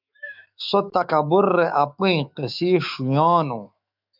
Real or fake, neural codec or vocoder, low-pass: fake; autoencoder, 48 kHz, 128 numbers a frame, DAC-VAE, trained on Japanese speech; 5.4 kHz